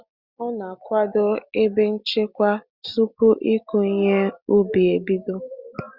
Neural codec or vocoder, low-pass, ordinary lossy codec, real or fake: none; 5.4 kHz; Opus, 64 kbps; real